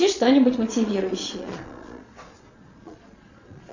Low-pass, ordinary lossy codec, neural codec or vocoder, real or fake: 7.2 kHz; AAC, 48 kbps; vocoder, 22.05 kHz, 80 mel bands, Vocos; fake